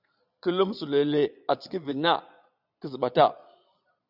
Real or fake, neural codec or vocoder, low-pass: fake; vocoder, 22.05 kHz, 80 mel bands, Vocos; 5.4 kHz